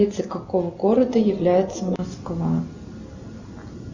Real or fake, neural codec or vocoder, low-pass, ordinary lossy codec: real; none; 7.2 kHz; Opus, 64 kbps